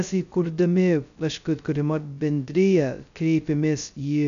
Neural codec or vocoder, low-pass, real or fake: codec, 16 kHz, 0.2 kbps, FocalCodec; 7.2 kHz; fake